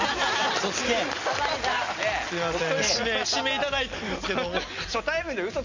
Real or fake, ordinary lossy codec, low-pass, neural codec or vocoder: real; MP3, 64 kbps; 7.2 kHz; none